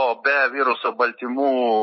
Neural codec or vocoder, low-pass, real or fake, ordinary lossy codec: none; 7.2 kHz; real; MP3, 24 kbps